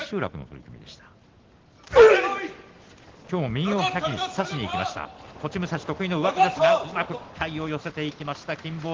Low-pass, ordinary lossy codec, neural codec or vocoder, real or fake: 7.2 kHz; Opus, 16 kbps; none; real